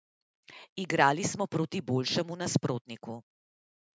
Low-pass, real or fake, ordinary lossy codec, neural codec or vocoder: none; real; none; none